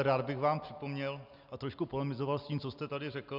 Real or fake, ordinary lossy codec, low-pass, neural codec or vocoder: real; Opus, 64 kbps; 5.4 kHz; none